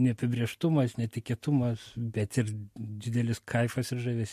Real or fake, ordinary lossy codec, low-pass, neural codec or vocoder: real; MP3, 64 kbps; 14.4 kHz; none